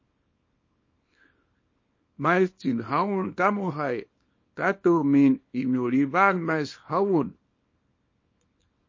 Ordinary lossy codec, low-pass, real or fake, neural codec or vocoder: MP3, 32 kbps; 7.2 kHz; fake; codec, 24 kHz, 0.9 kbps, WavTokenizer, small release